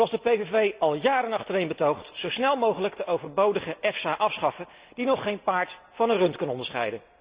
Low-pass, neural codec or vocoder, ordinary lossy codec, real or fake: 3.6 kHz; none; Opus, 64 kbps; real